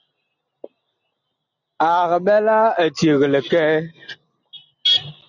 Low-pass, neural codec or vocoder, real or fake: 7.2 kHz; none; real